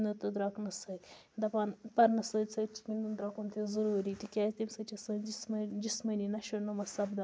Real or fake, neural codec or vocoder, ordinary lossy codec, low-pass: real; none; none; none